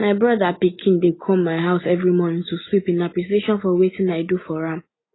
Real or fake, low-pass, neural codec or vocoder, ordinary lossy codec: real; 7.2 kHz; none; AAC, 16 kbps